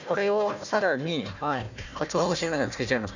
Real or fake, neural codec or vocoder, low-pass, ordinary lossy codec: fake; codec, 16 kHz, 1 kbps, FunCodec, trained on Chinese and English, 50 frames a second; 7.2 kHz; none